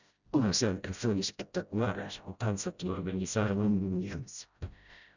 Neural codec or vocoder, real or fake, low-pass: codec, 16 kHz, 0.5 kbps, FreqCodec, smaller model; fake; 7.2 kHz